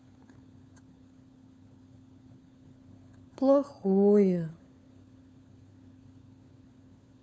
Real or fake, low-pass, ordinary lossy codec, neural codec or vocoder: fake; none; none; codec, 16 kHz, 4 kbps, FunCodec, trained on LibriTTS, 50 frames a second